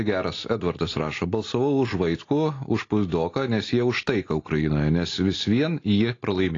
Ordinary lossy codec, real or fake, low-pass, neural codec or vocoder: AAC, 32 kbps; real; 7.2 kHz; none